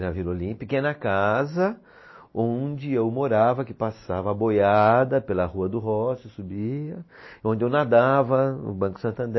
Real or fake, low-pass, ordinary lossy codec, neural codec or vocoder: real; 7.2 kHz; MP3, 24 kbps; none